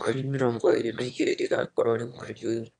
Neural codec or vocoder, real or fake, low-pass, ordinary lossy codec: autoencoder, 22.05 kHz, a latent of 192 numbers a frame, VITS, trained on one speaker; fake; 9.9 kHz; none